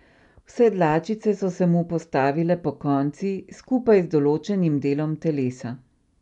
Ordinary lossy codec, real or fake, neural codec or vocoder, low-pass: none; real; none; 10.8 kHz